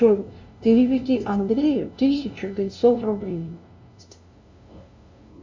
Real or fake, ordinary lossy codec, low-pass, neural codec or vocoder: fake; MP3, 48 kbps; 7.2 kHz; codec, 16 kHz, 0.5 kbps, FunCodec, trained on LibriTTS, 25 frames a second